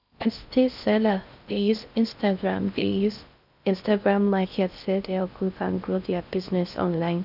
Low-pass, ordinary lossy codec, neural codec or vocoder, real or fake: 5.4 kHz; none; codec, 16 kHz in and 24 kHz out, 0.6 kbps, FocalCodec, streaming, 2048 codes; fake